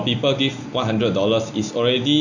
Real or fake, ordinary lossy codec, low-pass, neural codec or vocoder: real; none; 7.2 kHz; none